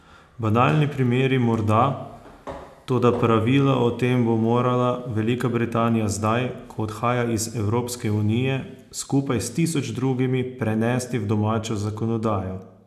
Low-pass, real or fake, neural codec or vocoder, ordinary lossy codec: 14.4 kHz; real; none; none